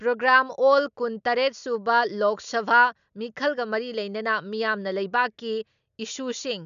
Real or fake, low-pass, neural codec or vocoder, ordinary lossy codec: real; 7.2 kHz; none; none